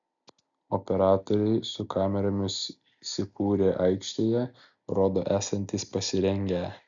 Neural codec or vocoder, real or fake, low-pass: none; real; 7.2 kHz